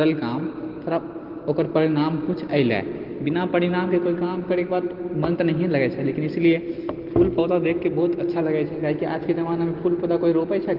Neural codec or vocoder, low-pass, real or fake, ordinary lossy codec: none; 5.4 kHz; real; Opus, 16 kbps